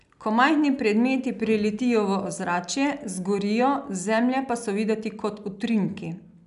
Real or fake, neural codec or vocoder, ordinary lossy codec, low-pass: real; none; none; 10.8 kHz